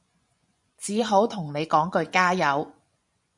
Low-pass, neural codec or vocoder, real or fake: 10.8 kHz; vocoder, 24 kHz, 100 mel bands, Vocos; fake